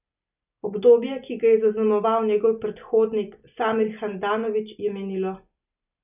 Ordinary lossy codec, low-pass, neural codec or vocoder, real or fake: none; 3.6 kHz; none; real